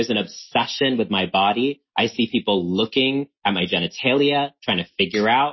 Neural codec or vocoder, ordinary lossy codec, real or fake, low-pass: none; MP3, 24 kbps; real; 7.2 kHz